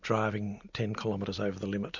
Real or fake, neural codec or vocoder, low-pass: real; none; 7.2 kHz